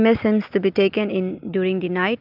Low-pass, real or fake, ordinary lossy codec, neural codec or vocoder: 5.4 kHz; real; Opus, 24 kbps; none